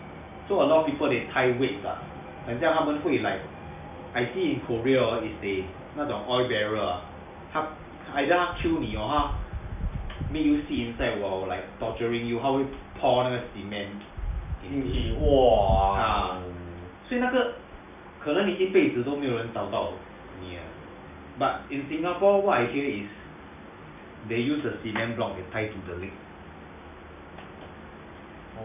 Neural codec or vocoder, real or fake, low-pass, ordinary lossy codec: none; real; 3.6 kHz; none